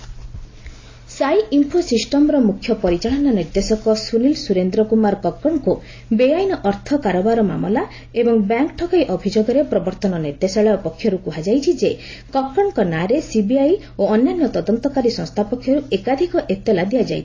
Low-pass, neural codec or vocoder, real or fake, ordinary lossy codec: 7.2 kHz; none; real; AAC, 32 kbps